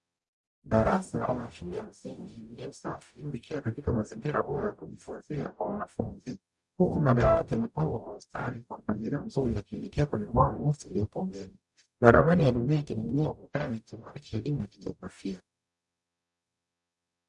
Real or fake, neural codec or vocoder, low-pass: fake; codec, 44.1 kHz, 0.9 kbps, DAC; 10.8 kHz